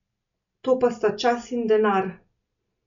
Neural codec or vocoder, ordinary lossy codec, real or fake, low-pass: none; MP3, 96 kbps; real; 7.2 kHz